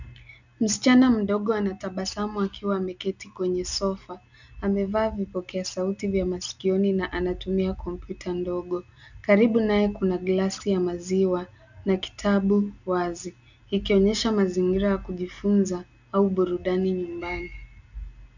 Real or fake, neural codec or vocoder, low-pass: real; none; 7.2 kHz